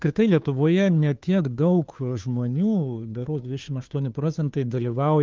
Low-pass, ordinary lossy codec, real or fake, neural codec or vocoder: 7.2 kHz; Opus, 24 kbps; fake; codec, 16 kHz, 2 kbps, FunCodec, trained on Chinese and English, 25 frames a second